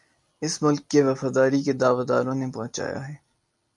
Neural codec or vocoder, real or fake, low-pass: none; real; 10.8 kHz